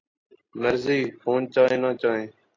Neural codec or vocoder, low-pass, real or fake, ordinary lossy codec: none; 7.2 kHz; real; AAC, 32 kbps